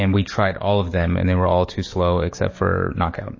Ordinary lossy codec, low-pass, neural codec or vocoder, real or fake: MP3, 32 kbps; 7.2 kHz; codec, 44.1 kHz, 7.8 kbps, DAC; fake